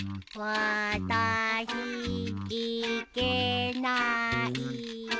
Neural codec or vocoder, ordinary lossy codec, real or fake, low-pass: none; none; real; none